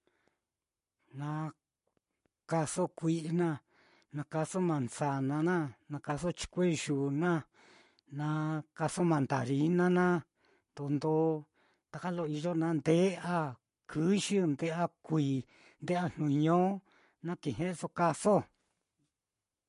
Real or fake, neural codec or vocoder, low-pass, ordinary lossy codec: fake; codec, 44.1 kHz, 7.8 kbps, Pupu-Codec; 14.4 kHz; MP3, 48 kbps